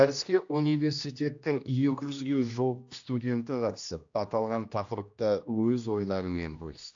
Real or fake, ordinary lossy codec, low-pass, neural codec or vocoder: fake; AAC, 64 kbps; 7.2 kHz; codec, 16 kHz, 1 kbps, X-Codec, HuBERT features, trained on general audio